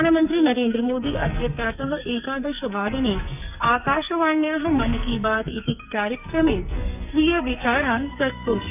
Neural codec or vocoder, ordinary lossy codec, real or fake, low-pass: codec, 44.1 kHz, 2.6 kbps, SNAC; none; fake; 3.6 kHz